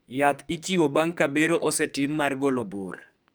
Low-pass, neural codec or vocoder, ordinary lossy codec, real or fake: none; codec, 44.1 kHz, 2.6 kbps, SNAC; none; fake